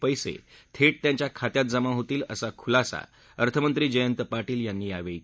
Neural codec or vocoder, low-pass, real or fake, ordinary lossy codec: none; none; real; none